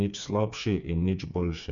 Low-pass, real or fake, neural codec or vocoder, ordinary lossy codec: 7.2 kHz; fake; codec, 16 kHz, 4 kbps, FreqCodec, smaller model; none